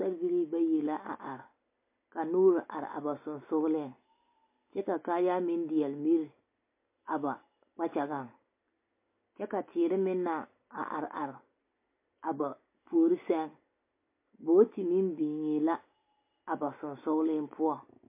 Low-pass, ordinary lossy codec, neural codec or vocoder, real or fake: 3.6 kHz; MP3, 24 kbps; none; real